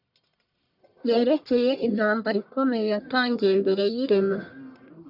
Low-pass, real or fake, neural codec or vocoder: 5.4 kHz; fake; codec, 44.1 kHz, 1.7 kbps, Pupu-Codec